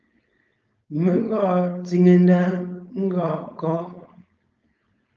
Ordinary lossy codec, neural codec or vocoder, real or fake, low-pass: Opus, 24 kbps; codec, 16 kHz, 4.8 kbps, FACodec; fake; 7.2 kHz